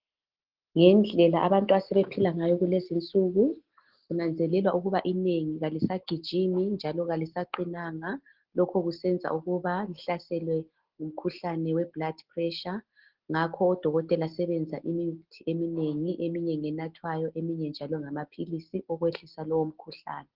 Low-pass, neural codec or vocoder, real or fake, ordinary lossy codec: 5.4 kHz; none; real; Opus, 16 kbps